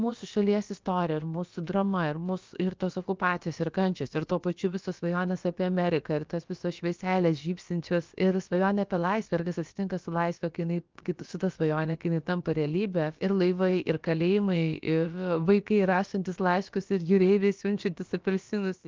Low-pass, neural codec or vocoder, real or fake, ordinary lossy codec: 7.2 kHz; codec, 16 kHz, about 1 kbps, DyCAST, with the encoder's durations; fake; Opus, 32 kbps